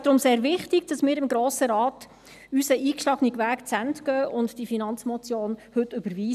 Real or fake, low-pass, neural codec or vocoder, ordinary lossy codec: real; 14.4 kHz; none; none